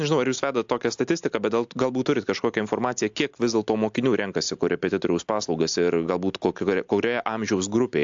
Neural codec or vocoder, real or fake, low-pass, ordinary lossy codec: none; real; 7.2 kHz; AAC, 64 kbps